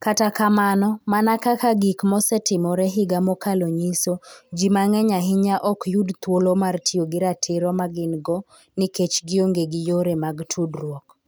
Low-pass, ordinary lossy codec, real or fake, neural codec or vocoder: none; none; real; none